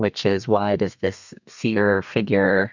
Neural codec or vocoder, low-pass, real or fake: codec, 32 kHz, 1.9 kbps, SNAC; 7.2 kHz; fake